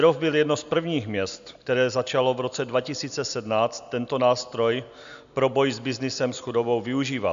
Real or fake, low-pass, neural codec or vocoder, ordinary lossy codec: real; 7.2 kHz; none; AAC, 96 kbps